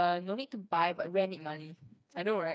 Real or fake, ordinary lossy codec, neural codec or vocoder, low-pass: fake; none; codec, 16 kHz, 2 kbps, FreqCodec, smaller model; none